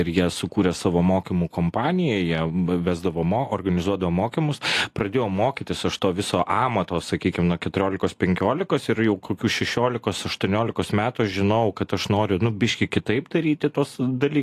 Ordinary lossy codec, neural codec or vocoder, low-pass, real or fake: AAC, 64 kbps; none; 14.4 kHz; real